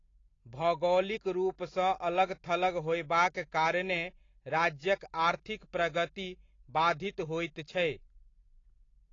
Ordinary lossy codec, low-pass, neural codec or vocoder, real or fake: AAC, 32 kbps; 7.2 kHz; none; real